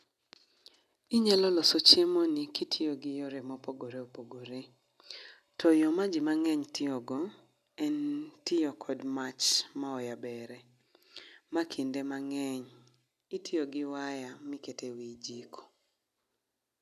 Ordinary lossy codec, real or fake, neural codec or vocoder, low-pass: none; real; none; 14.4 kHz